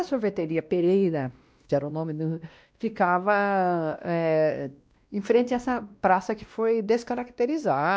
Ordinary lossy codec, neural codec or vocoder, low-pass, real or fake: none; codec, 16 kHz, 1 kbps, X-Codec, WavLM features, trained on Multilingual LibriSpeech; none; fake